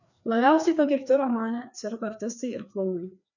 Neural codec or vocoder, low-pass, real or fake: codec, 16 kHz, 2 kbps, FreqCodec, larger model; 7.2 kHz; fake